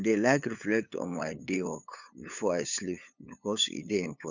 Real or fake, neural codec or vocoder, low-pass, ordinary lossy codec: fake; codec, 16 kHz, 8 kbps, FunCodec, trained on LibriTTS, 25 frames a second; 7.2 kHz; none